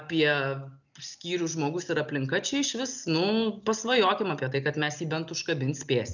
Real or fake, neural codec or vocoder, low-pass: real; none; 7.2 kHz